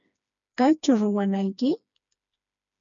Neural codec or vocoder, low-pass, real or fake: codec, 16 kHz, 2 kbps, FreqCodec, smaller model; 7.2 kHz; fake